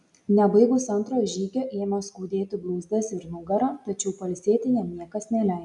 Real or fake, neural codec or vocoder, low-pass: real; none; 10.8 kHz